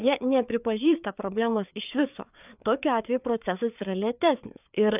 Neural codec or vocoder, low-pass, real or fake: codec, 16 kHz, 4 kbps, FreqCodec, larger model; 3.6 kHz; fake